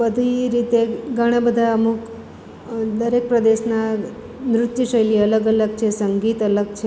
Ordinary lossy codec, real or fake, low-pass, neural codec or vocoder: none; real; none; none